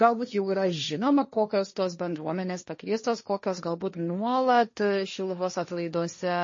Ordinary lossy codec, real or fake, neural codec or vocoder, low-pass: MP3, 32 kbps; fake; codec, 16 kHz, 1.1 kbps, Voila-Tokenizer; 7.2 kHz